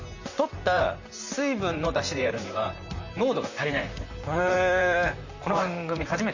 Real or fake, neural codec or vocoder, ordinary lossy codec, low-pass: fake; vocoder, 44.1 kHz, 128 mel bands, Pupu-Vocoder; Opus, 64 kbps; 7.2 kHz